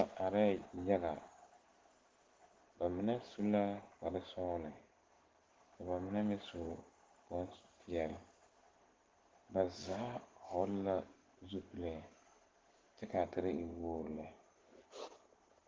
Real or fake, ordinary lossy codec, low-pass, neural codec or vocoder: real; Opus, 16 kbps; 7.2 kHz; none